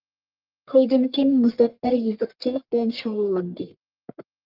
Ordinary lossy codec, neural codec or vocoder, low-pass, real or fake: Opus, 32 kbps; codec, 44.1 kHz, 1.7 kbps, Pupu-Codec; 5.4 kHz; fake